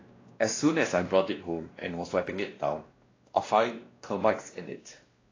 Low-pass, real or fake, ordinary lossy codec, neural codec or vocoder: 7.2 kHz; fake; AAC, 32 kbps; codec, 16 kHz, 1 kbps, X-Codec, WavLM features, trained on Multilingual LibriSpeech